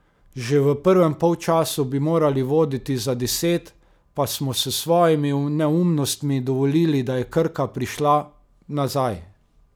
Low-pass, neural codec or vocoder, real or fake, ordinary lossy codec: none; none; real; none